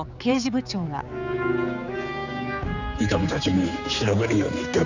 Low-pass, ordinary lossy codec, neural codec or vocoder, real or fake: 7.2 kHz; none; codec, 16 kHz, 4 kbps, X-Codec, HuBERT features, trained on general audio; fake